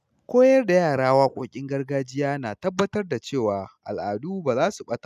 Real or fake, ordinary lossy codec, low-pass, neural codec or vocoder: real; none; 14.4 kHz; none